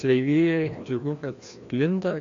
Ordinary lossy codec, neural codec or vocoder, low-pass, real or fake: AAC, 48 kbps; codec, 16 kHz, 1 kbps, FreqCodec, larger model; 7.2 kHz; fake